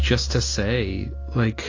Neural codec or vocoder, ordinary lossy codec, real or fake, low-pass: none; AAC, 32 kbps; real; 7.2 kHz